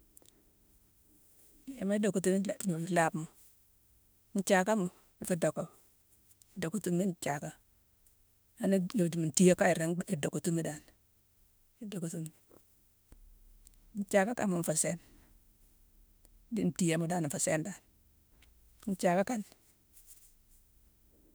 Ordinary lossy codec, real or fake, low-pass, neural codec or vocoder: none; fake; none; autoencoder, 48 kHz, 32 numbers a frame, DAC-VAE, trained on Japanese speech